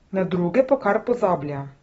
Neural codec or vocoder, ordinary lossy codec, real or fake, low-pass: none; AAC, 24 kbps; real; 19.8 kHz